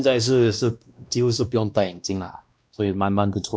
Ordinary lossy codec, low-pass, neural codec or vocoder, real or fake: none; none; codec, 16 kHz, 1 kbps, X-Codec, WavLM features, trained on Multilingual LibriSpeech; fake